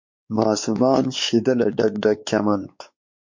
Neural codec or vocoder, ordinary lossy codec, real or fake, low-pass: codec, 16 kHz, 4 kbps, X-Codec, WavLM features, trained on Multilingual LibriSpeech; MP3, 48 kbps; fake; 7.2 kHz